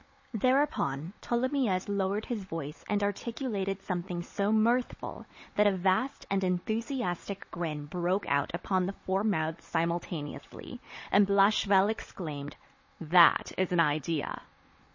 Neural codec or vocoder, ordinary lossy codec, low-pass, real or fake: codec, 16 kHz, 16 kbps, FunCodec, trained on Chinese and English, 50 frames a second; MP3, 32 kbps; 7.2 kHz; fake